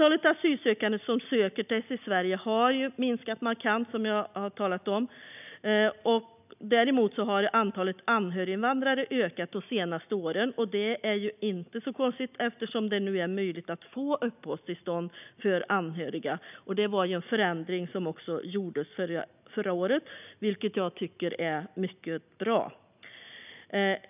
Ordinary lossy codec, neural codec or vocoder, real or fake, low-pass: none; none; real; 3.6 kHz